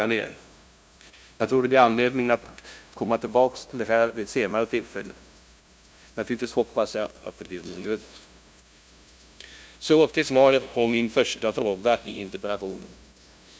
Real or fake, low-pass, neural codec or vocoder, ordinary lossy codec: fake; none; codec, 16 kHz, 0.5 kbps, FunCodec, trained on LibriTTS, 25 frames a second; none